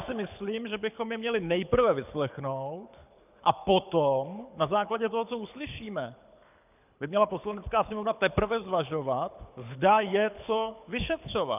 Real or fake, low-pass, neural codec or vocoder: fake; 3.6 kHz; codec, 24 kHz, 6 kbps, HILCodec